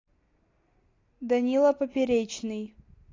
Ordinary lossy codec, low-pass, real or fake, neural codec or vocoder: AAC, 32 kbps; 7.2 kHz; real; none